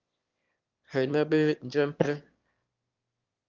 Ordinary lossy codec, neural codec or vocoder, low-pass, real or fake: Opus, 32 kbps; autoencoder, 22.05 kHz, a latent of 192 numbers a frame, VITS, trained on one speaker; 7.2 kHz; fake